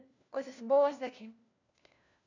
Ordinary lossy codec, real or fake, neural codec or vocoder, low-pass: none; fake; codec, 16 kHz, 1 kbps, FunCodec, trained on LibriTTS, 50 frames a second; 7.2 kHz